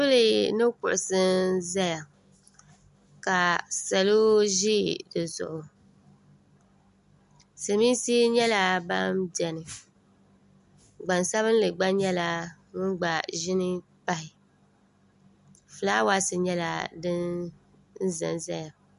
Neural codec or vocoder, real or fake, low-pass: none; real; 10.8 kHz